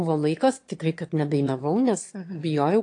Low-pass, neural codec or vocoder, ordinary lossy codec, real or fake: 9.9 kHz; autoencoder, 22.05 kHz, a latent of 192 numbers a frame, VITS, trained on one speaker; AAC, 48 kbps; fake